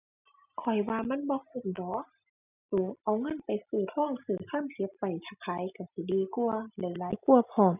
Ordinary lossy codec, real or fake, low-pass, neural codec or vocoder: none; real; 3.6 kHz; none